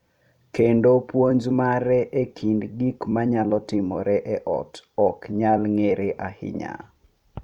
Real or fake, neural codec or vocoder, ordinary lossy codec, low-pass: fake; vocoder, 44.1 kHz, 128 mel bands every 256 samples, BigVGAN v2; none; 19.8 kHz